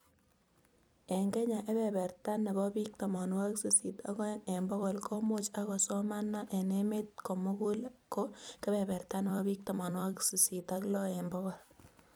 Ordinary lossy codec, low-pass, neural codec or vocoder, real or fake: none; none; vocoder, 44.1 kHz, 128 mel bands every 256 samples, BigVGAN v2; fake